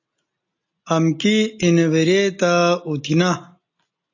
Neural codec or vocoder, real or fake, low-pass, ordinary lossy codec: none; real; 7.2 kHz; AAC, 48 kbps